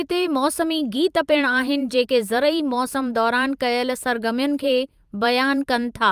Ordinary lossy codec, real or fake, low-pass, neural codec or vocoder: Opus, 32 kbps; fake; 14.4 kHz; vocoder, 44.1 kHz, 128 mel bands every 512 samples, BigVGAN v2